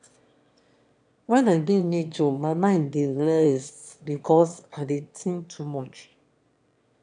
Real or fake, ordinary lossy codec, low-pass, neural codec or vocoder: fake; AAC, 64 kbps; 9.9 kHz; autoencoder, 22.05 kHz, a latent of 192 numbers a frame, VITS, trained on one speaker